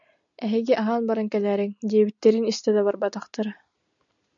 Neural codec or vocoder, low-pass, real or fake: none; 7.2 kHz; real